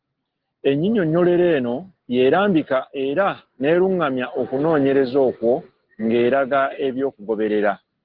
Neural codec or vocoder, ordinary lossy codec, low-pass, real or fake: none; Opus, 16 kbps; 5.4 kHz; real